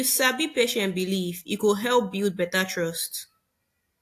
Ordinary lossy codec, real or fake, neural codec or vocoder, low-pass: AAC, 64 kbps; real; none; 14.4 kHz